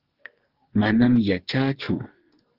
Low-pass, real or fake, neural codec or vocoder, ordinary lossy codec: 5.4 kHz; fake; codec, 44.1 kHz, 2.6 kbps, SNAC; Opus, 16 kbps